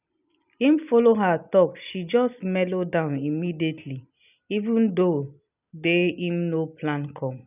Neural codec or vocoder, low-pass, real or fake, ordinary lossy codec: none; 3.6 kHz; real; none